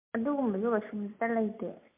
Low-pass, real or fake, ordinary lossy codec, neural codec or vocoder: 3.6 kHz; real; MP3, 32 kbps; none